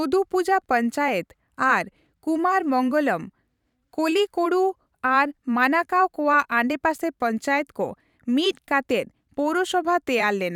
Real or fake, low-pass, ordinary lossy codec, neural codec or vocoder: fake; 19.8 kHz; none; vocoder, 44.1 kHz, 128 mel bands every 512 samples, BigVGAN v2